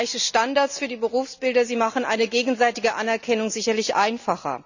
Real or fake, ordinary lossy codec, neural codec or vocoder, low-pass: real; none; none; 7.2 kHz